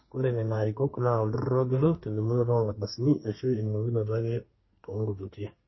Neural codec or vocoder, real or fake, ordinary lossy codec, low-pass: codec, 32 kHz, 1.9 kbps, SNAC; fake; MP3, 24 kbps; 7.2 kHz